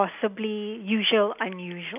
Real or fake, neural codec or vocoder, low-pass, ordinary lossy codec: real; none; 3.6 kHz; none